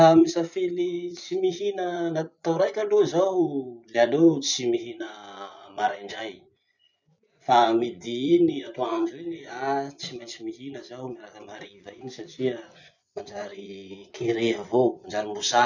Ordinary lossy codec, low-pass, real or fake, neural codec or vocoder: none; 7.2 kHz; fake; vocoder, 24 kHz, 100 mel bands, Vocos